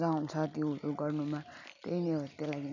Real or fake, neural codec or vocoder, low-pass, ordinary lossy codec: real; none; 7.2 kHz; none